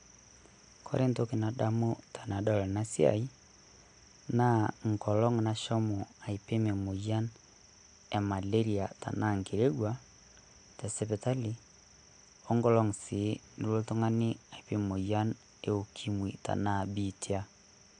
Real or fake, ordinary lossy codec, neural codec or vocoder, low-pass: real; AAC, 64 kbps; none; 9.9 kHz